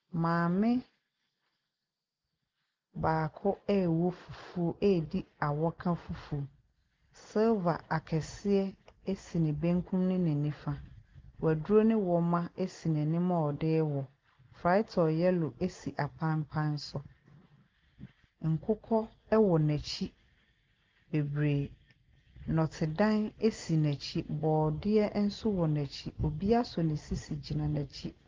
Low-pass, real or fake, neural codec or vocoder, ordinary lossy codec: 7.2 kHz; real; none; Opus, 32 kbps